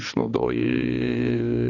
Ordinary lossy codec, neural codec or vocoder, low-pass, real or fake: AAC, 32 kbps; codec, 16 kHz, 2 kbps, X-Codec, HuBERT features, trained on balanced general audio; 7.2 kHz; fake